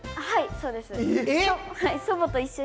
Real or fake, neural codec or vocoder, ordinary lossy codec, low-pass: real; none; none; none